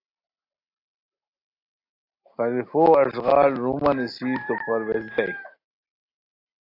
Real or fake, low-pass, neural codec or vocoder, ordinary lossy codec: real; 5.4 kHz; none; AAC, 32 kbps